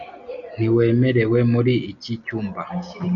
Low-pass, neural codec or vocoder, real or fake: 7.2 kHz; none; real